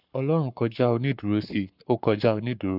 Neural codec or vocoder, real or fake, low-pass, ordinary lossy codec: codec, 44.1 kHz, 7.8 kbps, Pupu-Codec; fake; 5.4 kHz; none